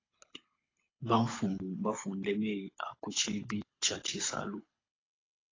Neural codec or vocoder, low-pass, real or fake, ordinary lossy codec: codec, 24 kHz, 6 kbps, HILCodec; 7.2 kHz; fake; AAC, 32 kbps